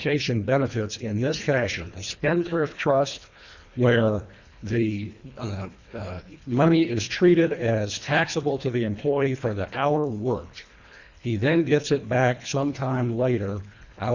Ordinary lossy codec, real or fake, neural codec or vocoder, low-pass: Opus, 64 kbps; fake; codec, 24 kHz, 1.5 kbps, HILCodec; 7.2 kHz